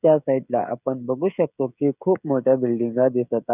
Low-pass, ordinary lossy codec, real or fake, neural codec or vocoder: 3.6 kHz; none; fake; codec, 16 kHz, 16 kbps, FreqCodec, smaller model